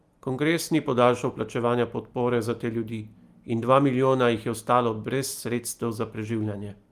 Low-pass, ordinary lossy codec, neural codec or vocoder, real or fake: 14.4 kHz; Opus, 32 kbps; none; real